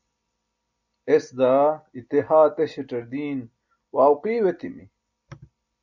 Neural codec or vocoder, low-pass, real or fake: none; 7.2 kHz; real